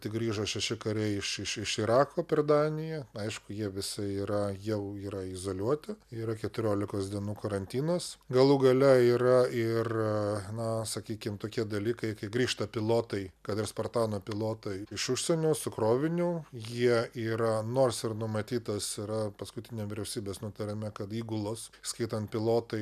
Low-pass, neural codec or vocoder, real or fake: 14.4 kHz; none; real